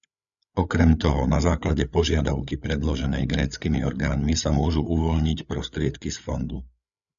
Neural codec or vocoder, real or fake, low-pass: codec, 16 kHz, 8 kbps, FreqCodec, larger model; fake; 7.2 kHz